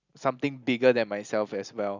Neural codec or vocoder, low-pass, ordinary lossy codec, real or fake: none; 7.2 kHz; none; real